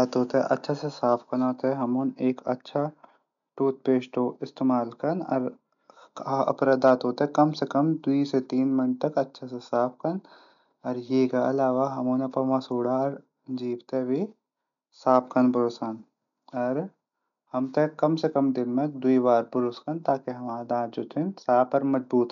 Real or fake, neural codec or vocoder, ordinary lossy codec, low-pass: real; none; none; 7.2 kHz